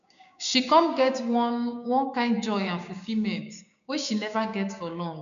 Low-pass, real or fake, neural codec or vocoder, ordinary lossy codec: 7.2 kHz; fake; codec, 16 kHz, 6 kbps, DAC; none